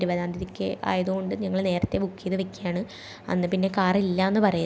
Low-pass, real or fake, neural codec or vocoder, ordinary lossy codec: none; real; none; none